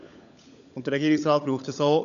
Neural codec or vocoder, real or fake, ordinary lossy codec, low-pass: codec, 16 kHz, 16 kbps, FunCodec, trained on LibriTTS, 50 frames a second; fake; none; 7.2 kHz